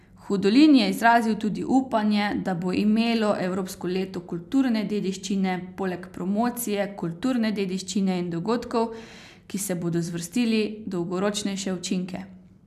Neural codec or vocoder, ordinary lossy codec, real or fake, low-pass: none; none; real; 14.4 kHz